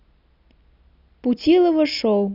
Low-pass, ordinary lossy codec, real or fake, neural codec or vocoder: 5.4 kHz; none; real; none